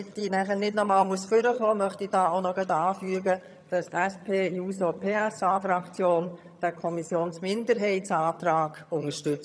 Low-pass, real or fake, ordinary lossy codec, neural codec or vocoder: none; fake; none; vocoder, 22.05 kHz, 80 mel bands, HiFi-GAN